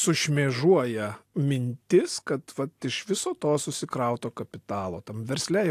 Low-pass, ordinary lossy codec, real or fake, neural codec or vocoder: 14.4 kHz; AAC, 64 kbps; real; none